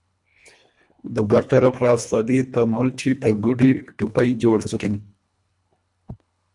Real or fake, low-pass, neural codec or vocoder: fake; 10.8 kHz; codec, 24 kHz, 1.5 kbps, HILCodec